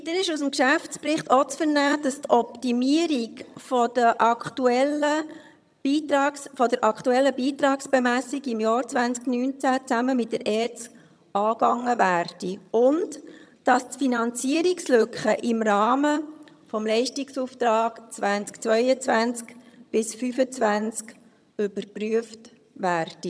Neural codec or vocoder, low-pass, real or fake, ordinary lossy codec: vocoder, 22.05 kHz, 80 mel bands, HiFi-GAN; none; fake; none